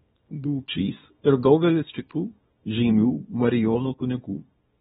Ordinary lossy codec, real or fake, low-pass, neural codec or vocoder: AAC, 16 kbps; fake; 10.8 kHz; codec, 24 kHz, 0.9 kbps, WavTokenizer, small release